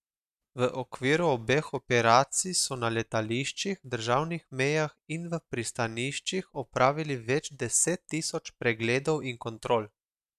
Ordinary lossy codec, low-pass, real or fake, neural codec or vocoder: none; 14.4 kHz; real; none